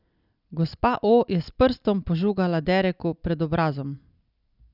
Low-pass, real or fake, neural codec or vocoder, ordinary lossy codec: 5.4 kHz; real; none; none